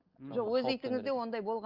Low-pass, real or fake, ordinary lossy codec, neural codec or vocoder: 5.4 kHz; real; Opus, 24 kbps; none